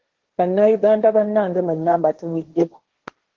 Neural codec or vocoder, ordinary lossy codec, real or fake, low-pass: codec, 16 kHz, 1.1 kbps, Voila-Tokenizer; Opus, 16 kbps; fake; 7.2 kHz